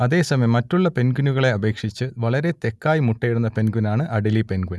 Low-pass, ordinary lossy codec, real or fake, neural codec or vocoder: none; none; real; none